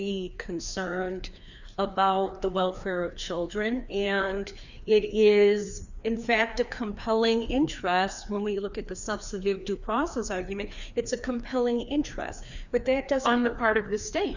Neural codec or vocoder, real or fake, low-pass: codec, 16 kHz, 2 kbps, FreqCodec, larger model; fake; 7.2 kHz